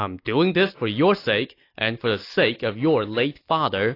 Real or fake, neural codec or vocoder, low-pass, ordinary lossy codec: real; none; 5.4 kHz; AAC, 32 kbps